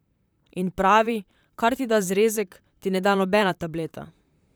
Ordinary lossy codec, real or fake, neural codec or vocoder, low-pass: none; fake; vocoder, 44.1 kHz, 128 mel bands, Pupu-Vocoder; none